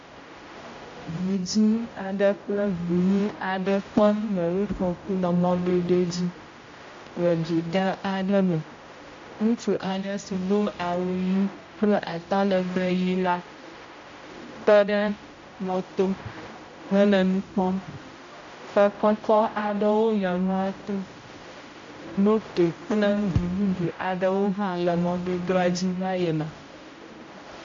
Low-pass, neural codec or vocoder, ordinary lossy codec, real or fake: 7.2 kHz; codec, 16 kHz, 0.5 kbps, X-Codec, HuBERT features, trained on general audio; AAC, 48 kbps; fake